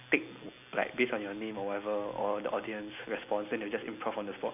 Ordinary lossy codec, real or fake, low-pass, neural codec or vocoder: none; real; 3.6 kHz; none